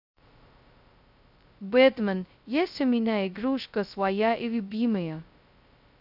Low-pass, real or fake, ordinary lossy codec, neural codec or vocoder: 5.4 kHz; fake; none; codec, 16 kHz, 0.2 kbps, FocalCodec